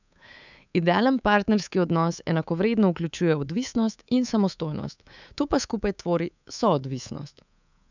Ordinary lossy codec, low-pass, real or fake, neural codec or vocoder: none; 7.2 kHz; fake; codec, 24 kHz, 3.1 kbps, DualCodec